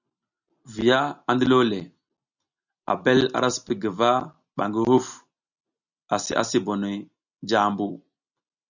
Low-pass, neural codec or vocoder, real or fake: 7.2 kHz; none; real